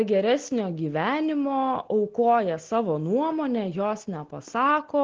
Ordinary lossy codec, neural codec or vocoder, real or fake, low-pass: Opus, 16 kbps; none; real; 7.2 kHz